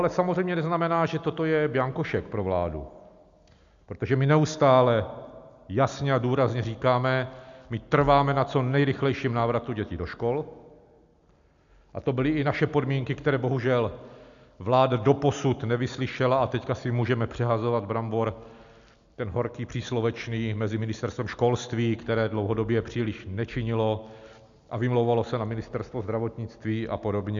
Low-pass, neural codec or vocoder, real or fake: 7.2 kHz; none; real